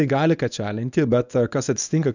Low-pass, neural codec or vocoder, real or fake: 7.2 kHz; codec, 24 kHz, 0.9 kbps, WavTokenizer, medium speech release version 1; fake